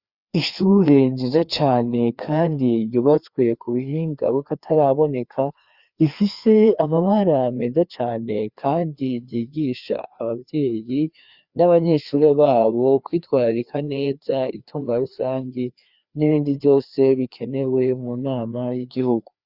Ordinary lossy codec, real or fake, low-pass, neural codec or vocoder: Opus, 64 kbps; fake; 5.4 kHz; codec, 16 kHz, 2 kbps, FreqCodec, larger model